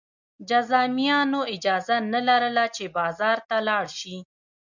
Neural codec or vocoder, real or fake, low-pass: none; real; 7.2 kHz